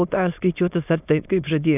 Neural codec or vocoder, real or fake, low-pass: autoencoder, 22.05 kHz, a latent of 192 numbers a frame, VITS, trained on many speakers; fake; 3.6 kHz